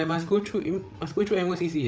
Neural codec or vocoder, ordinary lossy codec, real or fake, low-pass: codec, 16 kHz, 8 kbps, FreqCodec, larger model; none; fake; none